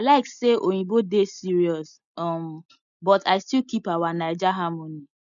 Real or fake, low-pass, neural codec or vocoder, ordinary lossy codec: real; 7.2 kHz; none; none